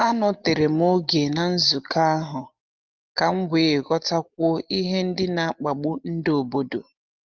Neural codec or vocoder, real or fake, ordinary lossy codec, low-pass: none; real; Opus, 32 kbps; 7.2 kHz